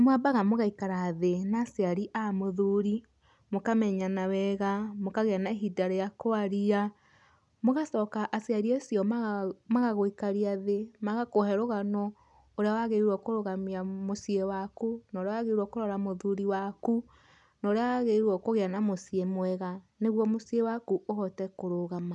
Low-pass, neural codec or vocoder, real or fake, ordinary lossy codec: 10.8 kHz; none; real; none